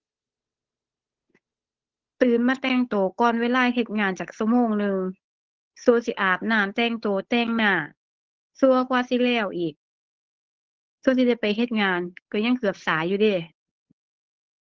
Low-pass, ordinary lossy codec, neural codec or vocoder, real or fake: 7.2 kHz; Opus, 16 kbps; codec, 16 kHz, 8 kbps, FunCodec, trained on Chinese and English, 25 frames a second; fake